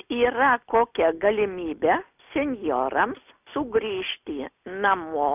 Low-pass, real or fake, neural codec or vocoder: 3.6 kHz; real; none